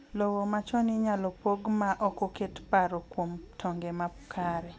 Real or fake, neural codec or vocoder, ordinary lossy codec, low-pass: real; none; none; none